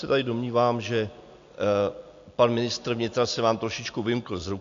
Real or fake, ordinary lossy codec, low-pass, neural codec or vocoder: real; AAC, 64 kbps; 7.2 kHz; none